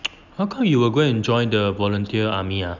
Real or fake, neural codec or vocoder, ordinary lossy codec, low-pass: real; none; none; 7.2 kHz